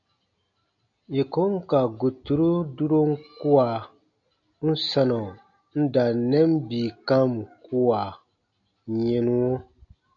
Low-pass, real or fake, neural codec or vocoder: 7.2 kHz; real; none